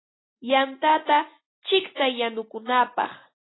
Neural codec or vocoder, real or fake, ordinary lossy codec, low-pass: none; real; AAC, 16 kbps; 7.2 kHz